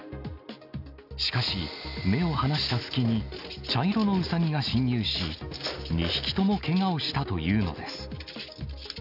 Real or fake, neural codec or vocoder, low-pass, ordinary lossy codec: real; none; 5.4 kHz; AAC, 48 kbps